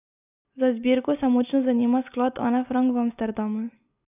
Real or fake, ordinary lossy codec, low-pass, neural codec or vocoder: real; none; 3.6 kHz; none